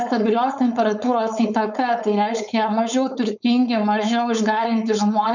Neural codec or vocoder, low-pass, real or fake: codec, 16 kHz, 4.8 kbps, FACodec; 7.2 kHz; fake